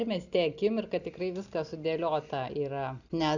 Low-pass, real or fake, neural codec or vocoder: 7.2 kHz; real; none